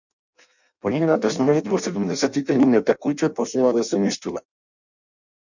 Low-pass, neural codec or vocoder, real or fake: 7.2 kHz; codec, 16 kHz in and 24 kHz out, 0.6 kbps, FireRedTTS-2 codec; fake